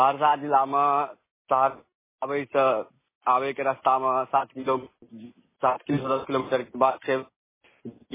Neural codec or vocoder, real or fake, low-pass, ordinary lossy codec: none; real; 3.6 kHz; MP3, 16 kbps